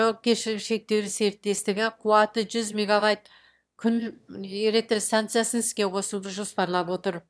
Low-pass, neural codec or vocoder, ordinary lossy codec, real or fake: none; autoencoder, 22.05 kHz, a latent of 192 numbers a frame, VITS, trained on one speaker; none; fake